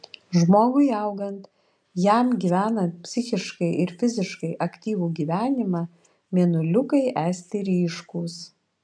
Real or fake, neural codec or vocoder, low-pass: real; none; 9.9 kHz